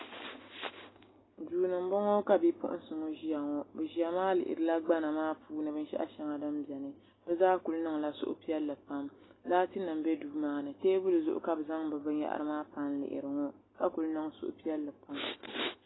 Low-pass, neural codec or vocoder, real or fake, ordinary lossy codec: 7.2 kHz; none; real; AAC, 16 kbps